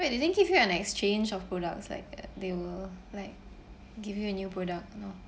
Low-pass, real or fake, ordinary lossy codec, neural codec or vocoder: none; real; none; none